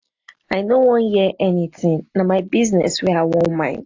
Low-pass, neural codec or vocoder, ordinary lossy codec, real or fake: 7.2 kHz; none; AAC, 48 kbps; real